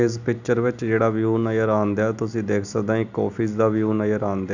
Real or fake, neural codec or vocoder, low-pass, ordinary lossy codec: real; none; 7.2 kHz; none